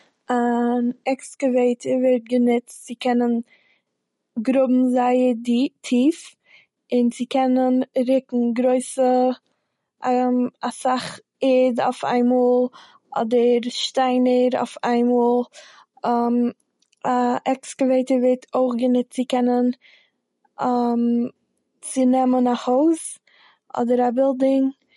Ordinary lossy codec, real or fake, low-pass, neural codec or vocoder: MP3, 48 kbps; real; 19.8 kHz; none